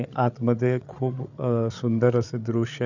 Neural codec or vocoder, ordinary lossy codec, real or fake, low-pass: codec, 16 kHz, 8 kbps, FreqCodec, larger model; none; fake; 7.2 kHz